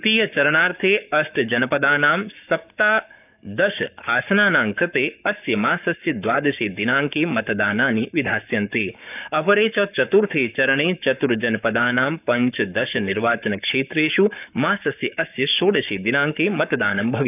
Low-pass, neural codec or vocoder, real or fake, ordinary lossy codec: 3.6 kHz; codec, 44.1 kHz, 7.8 kbps, Pupu-Codec; fake; none